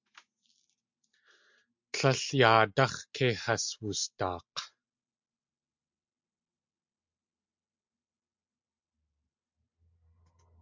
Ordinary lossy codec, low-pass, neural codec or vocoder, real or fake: MP3, 64 kbps; 7.2 kHz; none; real